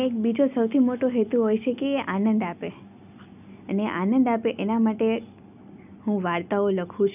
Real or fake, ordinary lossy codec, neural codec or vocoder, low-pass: real; none; none; 3.6 kHz